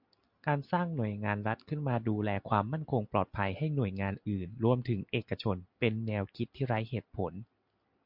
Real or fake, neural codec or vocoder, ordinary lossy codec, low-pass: real; none; MP3, 48 kbps; 5.4 kHz